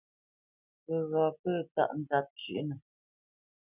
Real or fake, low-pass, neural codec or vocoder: real; 3.6 kHz; none